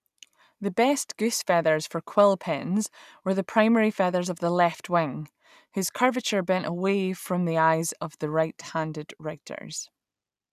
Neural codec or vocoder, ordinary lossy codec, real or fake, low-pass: none; none; real; 14.4 kHz